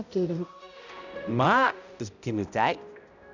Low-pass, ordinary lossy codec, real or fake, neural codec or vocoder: 7.2 kHz; none; fake; codec, 16 kHz, 0.5 kbps, X-Codec, HuBERT features, trained on balanced general audio